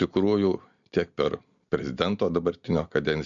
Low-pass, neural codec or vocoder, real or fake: 7.2 kHz; none; real